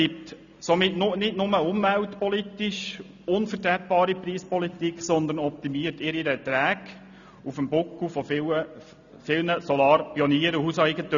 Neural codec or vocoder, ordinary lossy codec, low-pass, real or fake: none; none; 7.2 kHz; real